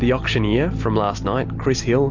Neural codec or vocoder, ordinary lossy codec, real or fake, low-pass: none; MP3, 48 kbps; real; 7.2 kHz